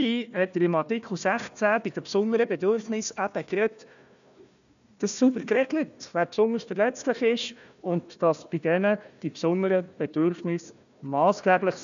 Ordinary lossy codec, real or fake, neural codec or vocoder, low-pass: none; fake; codec, 16 kHz, 1 kbps, FunCodec, trained on Chinese and English, 50 frames a second; 7.2 kHz